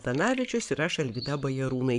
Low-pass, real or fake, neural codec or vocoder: 10.8 kHz; fake; codec, 44.1 kHz, 7.8 kbps, Pupu-Codec